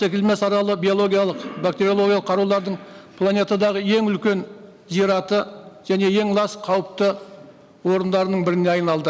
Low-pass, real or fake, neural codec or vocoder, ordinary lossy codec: none; real; none; none